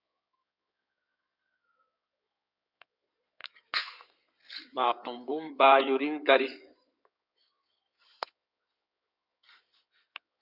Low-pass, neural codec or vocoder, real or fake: 5.4 kHz; codec, 16 kHz in and 24 kHz out, 2.2 kbps, FireRedTTS-2 codec; fake